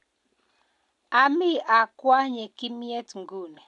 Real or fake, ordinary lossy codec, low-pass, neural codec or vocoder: real; none; 10.8 kHz; none